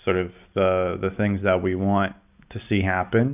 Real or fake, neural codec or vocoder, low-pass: real; none; 3.6 kHz